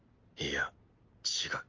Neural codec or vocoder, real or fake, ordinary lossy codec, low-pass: none; real; Opus, 24 kbps; 7.2 kHz